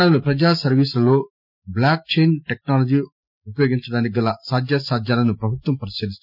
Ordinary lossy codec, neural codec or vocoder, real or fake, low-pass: none; none; real; 5.4 kHz